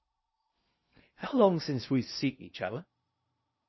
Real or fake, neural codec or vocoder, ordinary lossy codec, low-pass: fake; codec, 16 kHz in and 24 kHz out, 0.6 kbps, FocalCodec, streaming, 2048 codes; MP3, 24 kbps; 7.2 kHz